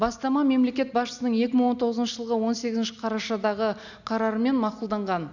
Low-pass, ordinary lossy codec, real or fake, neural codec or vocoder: 7.2 kHz; none; real; none